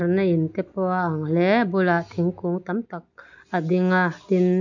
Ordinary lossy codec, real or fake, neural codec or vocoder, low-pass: none; real; none; 7.2 kHz